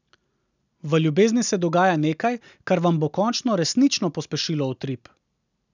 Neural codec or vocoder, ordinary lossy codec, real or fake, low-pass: none; none; real; 7.2 kHz